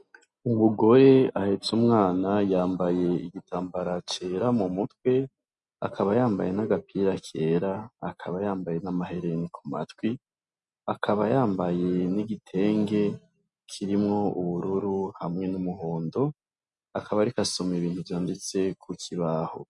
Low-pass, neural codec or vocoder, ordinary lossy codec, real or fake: 10.8 kHz; none; MP3, 64 kbps; real